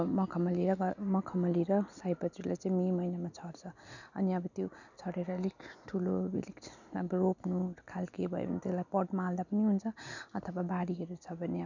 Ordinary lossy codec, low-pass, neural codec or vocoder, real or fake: none; 7.2 kHz; none; real